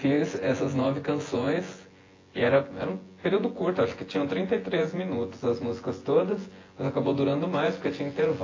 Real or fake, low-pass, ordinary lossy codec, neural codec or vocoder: fake; 7.2 kHz; AAC, 32 kbps; vocoder, 24 kHz, 100 mel bands, Vocos